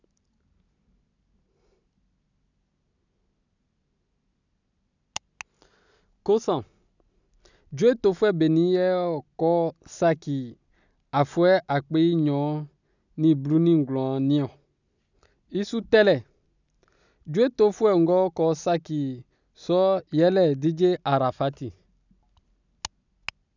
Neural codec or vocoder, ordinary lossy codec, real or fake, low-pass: none; none; real; 7.2 kHz